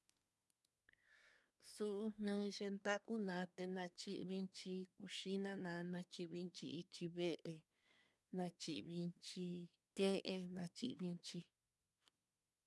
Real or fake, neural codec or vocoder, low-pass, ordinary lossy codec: fake; codec, 24 kHz, 1 kbps, SNAC; none; none